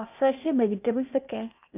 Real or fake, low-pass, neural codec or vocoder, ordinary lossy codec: fake; 3.6 kHz; codec, 16 kHz, 0.8 kbps, ZipCodec; none